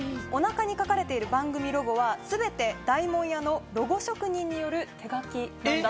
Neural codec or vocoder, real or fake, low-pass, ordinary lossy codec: none; real; none; none